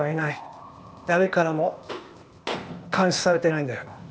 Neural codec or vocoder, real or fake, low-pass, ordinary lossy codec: codec, 16 kHz, 0.8 kbps, ZipCodec; fake; none; none